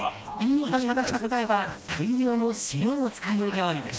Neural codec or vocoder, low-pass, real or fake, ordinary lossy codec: codec, 16 kHz, 1 kbps, FreqCodec, smaller model; none; fake; none